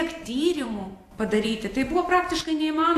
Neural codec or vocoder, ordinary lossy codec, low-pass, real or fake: vocoder, 44.1 kHz, 128 mel bands every 512 samples, BigVGAN v2; AAC, 64 kbps; 14.4 kHz; fake